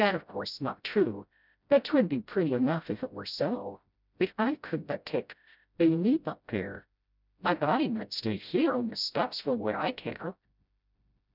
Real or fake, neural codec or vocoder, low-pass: fake; codec, 16 kHz, 0.5 kbps, FreqCodec, smaller model; 5.4 kHz